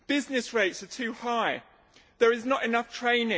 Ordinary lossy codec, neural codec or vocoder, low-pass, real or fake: none; none; none; real